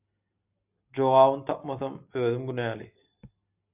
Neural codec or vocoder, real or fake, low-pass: none; real; 3.6 kHz